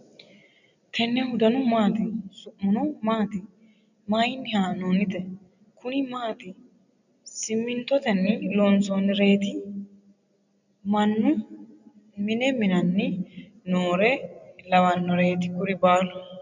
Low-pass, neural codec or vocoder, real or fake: 7.2 kHz; none; real